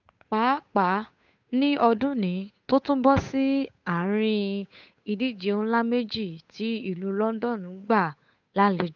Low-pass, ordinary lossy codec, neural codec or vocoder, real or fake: 7.2 kHz; none; codec, 16 kHz, 8 kbps, FunCodec, trained on Chinese and English, 25 frames a second; fake